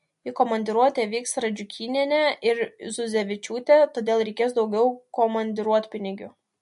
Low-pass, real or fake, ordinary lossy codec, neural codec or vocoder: 10.8 kHz; real; MP3, 48 kbps; none